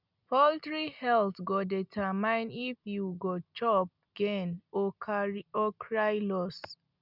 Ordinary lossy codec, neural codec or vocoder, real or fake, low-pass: none; none; real; 5.4 kHz